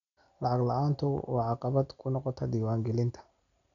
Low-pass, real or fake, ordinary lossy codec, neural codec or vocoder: 7.2 kHz; real; none; none